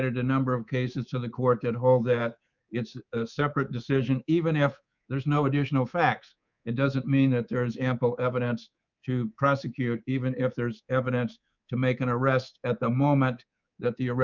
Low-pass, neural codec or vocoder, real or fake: 7.2 kHz; codec, 24 kHz, 3.1 kbps, DualCodec; fake